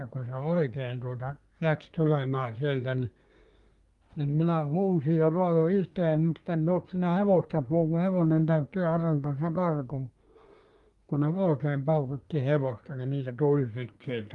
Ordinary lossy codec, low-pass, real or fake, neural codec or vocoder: Opus, 32 kbps; 10.8 kHz; fake; codec, 24 kHz, 1 kbps, SNAC